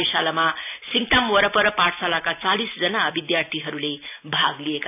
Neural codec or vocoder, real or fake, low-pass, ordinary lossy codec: none; real; 3.6 kHz; none